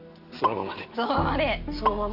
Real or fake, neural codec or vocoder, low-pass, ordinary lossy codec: real; none; 5.4 kHz; AAC, 48 kbps